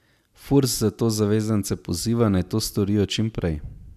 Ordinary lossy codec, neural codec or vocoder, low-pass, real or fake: none; none; 14.4 kHz; real